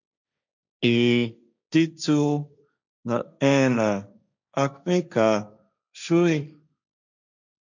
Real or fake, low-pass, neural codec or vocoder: fake; 7.2 kHz; codec, 16 kHz, 1.1 kbps, Voila-Tokenizer